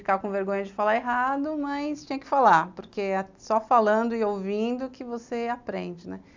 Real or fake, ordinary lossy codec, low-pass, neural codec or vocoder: real; MP3, 64 kbps; 7.2 kHz; none